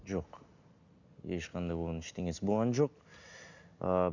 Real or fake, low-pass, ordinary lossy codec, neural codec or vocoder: real; 7.2 kHz; none; none